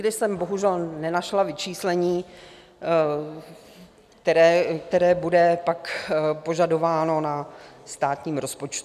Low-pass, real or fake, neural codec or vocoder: 14.4 kHz; real; none